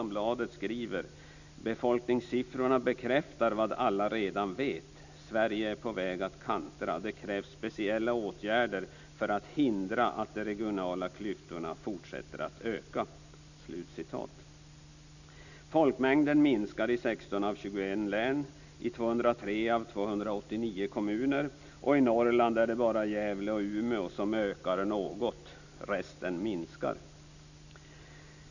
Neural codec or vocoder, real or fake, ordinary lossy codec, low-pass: none; real; none; 7.2 kHz